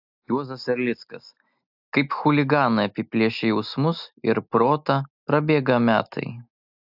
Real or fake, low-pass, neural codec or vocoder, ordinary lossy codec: real; 5.4 kHz; none; AAC, 48 kbps